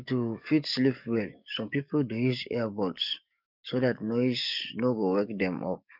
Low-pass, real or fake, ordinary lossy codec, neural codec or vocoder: 5.4 kHz; fake; none; codec, 44.1 kHz, 7.8 kbps, DAC